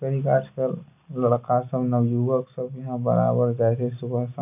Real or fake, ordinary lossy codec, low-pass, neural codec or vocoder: real; none; 3.6 kHz; none